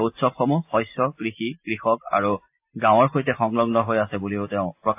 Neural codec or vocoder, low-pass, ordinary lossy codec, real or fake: none; 3.6 kHz; AAC, 32 kbps; real